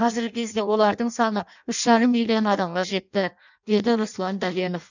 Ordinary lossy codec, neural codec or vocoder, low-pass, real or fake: none; codec, 16 kHz in and 24 kHz out, 0.6 kbps, FireRedTTS-2 codec; 7.2 kHz; fake